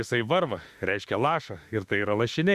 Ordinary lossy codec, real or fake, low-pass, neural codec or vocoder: Opus, 64 kbps; fake; 14.4 kHz; autoencoder, 48 kHz, 32 numbers a frame, DAC-VAE, trained on Japanese speech